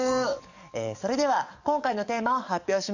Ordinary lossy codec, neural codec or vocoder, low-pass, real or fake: none; codec, 44.1 kHz, 7.8 kbps, DAC; 7.2 kHz; fake